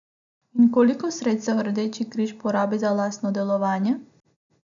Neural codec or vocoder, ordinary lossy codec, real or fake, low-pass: none; none; real; 7.2 kHz